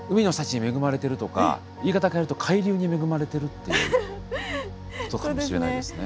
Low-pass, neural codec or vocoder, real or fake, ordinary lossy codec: none; none; real; none